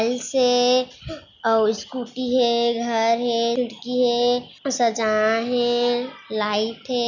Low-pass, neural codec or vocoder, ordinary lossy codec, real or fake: 7.2 kHz; none; none; real